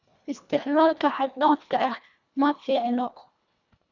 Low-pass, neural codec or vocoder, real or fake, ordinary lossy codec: 7.2 kHz; codec, 24 kHz, 1.5 kbps, HILCodec; fake; none